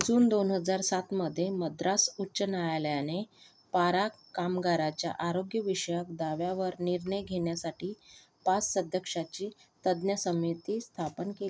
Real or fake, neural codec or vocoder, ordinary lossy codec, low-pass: real; none; none; none